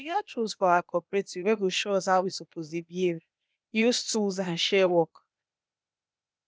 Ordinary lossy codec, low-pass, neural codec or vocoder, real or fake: none; none; codec, 16 kHz, 0.8 kbps, ZipCodec; fake